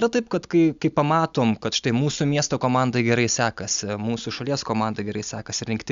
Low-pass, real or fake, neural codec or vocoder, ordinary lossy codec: 7.2 kHz; real; none; Opus, 64 kbps